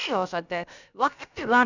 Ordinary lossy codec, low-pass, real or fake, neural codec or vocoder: none; 7.2 kHz; fake; codec, 16 kHz, about 1 kbps, DyCAST, with the encoder's durations